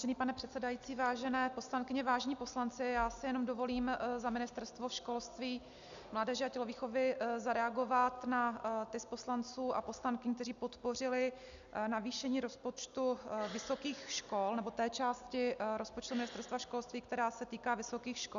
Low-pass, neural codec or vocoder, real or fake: 7.2 kHz; none; real